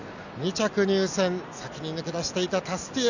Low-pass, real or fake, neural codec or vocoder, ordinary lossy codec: 7.2 kHz; real; none; none